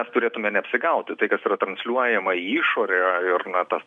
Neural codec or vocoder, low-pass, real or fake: none; 10.8 kHz; real